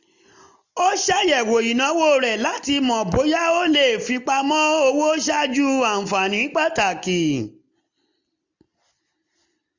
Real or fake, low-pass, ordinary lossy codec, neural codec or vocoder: real; 7.2 kHz; none; none